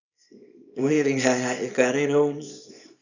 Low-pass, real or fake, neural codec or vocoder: 7.2 kHz; fake; codec, 24 kHz, 0.9 kbps, WavTokenizer, small release